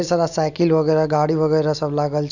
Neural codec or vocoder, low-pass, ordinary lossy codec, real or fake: none; 7.2 kHz; none; real